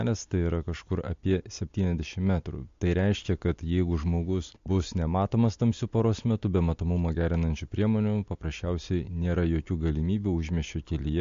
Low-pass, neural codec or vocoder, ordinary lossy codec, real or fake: 7.2 kHz; none; MP3, 48 kbps; real